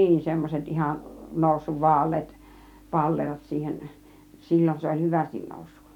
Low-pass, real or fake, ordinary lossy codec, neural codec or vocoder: 19.8 kHz; real; none; none